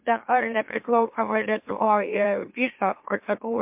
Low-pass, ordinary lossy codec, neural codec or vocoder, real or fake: 3.6 kHz; MP3, 32 kbps; autoencoder, 44.1 kHz, a latent of 192 numbers a frame, MeloTTS; fake